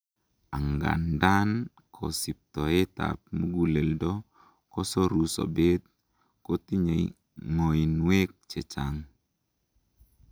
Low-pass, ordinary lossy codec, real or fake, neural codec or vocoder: none; none; real; none